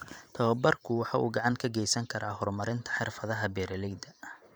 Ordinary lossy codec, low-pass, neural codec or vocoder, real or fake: none; none; vocoder, 44.1 kHz, 128 mel bands every 512 samples, BigVGAN v2; fake